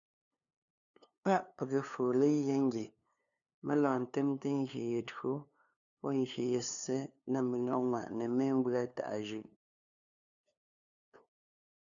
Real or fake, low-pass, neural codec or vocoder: fake; 7.2 kHz; codec, 16 kHz, 2 kbps, FunCodec, trained on LibriTTS, 25 frames a second